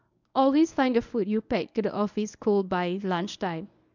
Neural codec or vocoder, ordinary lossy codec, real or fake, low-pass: codec, 24 kHz, 0.9 kbps, WavTokenizer, medium speech release version 1; none; fake; 7.2 kHz